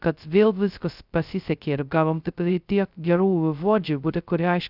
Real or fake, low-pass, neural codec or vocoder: fake; 5.4 kHz; codec, 16 kHz, 0.2 kbps, FocalCodec